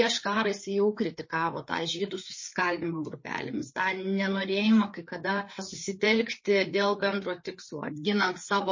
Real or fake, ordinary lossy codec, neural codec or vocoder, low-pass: fake; MP3, 32 kbps; codec, 16 kHz, 4 kbps, FreqCodec, larger model; 7.2 kHz